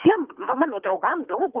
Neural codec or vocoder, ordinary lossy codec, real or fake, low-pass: codec, 24 kHz, 3 kbps, HILCodec; Opus, 32 kbps; fake; 3.6 kHz